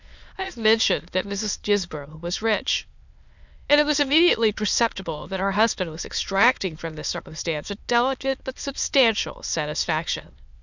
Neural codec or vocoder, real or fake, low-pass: autoencoder, 22.05 kHz, a latent of 192 numbers a frame, VITS, trained on many speakers; fake; 7.2 kHz